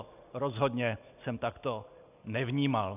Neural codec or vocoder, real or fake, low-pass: none; real; 3.6 kHz